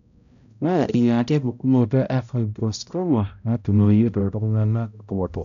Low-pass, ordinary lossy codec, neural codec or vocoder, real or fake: 7.2 kHz; MP3, 96 kbps; codec, 16 kHz, 0.5 kbps, X-Codec, HuBERT features, trained on balanced general audio; fake